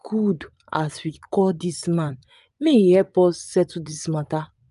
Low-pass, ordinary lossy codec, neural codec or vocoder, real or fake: 10.8 kHz; none; vocoder, 24 kHz, 100 mel bands, Vocos; fake